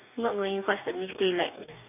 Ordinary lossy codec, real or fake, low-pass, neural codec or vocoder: none; fake; 3.6 kHz; codec, 44.1 kHz, 2.6 kbps, DAC